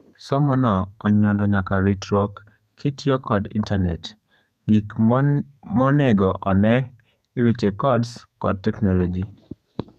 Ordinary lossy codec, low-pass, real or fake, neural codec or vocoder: none; 14.4 kHz; fake; codec, 32 kHz, 1.9 kbps, SNAC